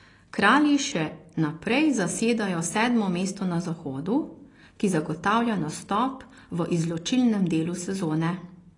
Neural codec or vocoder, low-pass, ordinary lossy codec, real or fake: none; 10.8 kHz; AAC, 32 kbps; real